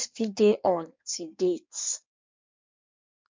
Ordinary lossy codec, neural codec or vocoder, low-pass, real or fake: MP3, 64 kbps; codec, 16 kHz in and 24 kHz out, 1.1 kbps, FireRedTTS-2 codec; 7.2 kHz; fake